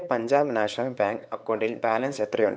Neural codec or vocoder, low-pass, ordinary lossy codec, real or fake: codec, 16 kHz, 4 kbps, X-Codec, WavLM features, trained on Multilingual LibriSpeech; none; none; fake